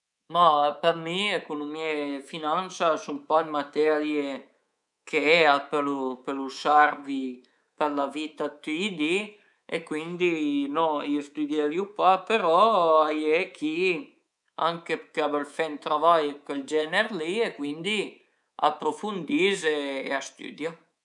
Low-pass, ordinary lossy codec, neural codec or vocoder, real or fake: 10.8 kHz; none; codec, 24 kHz, 3.1 kbps, DualCodec; fake